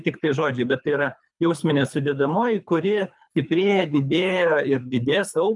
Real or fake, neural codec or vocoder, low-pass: fake; codec, 24 kHz, 3 kbps, HILCodec; 10.8 kHz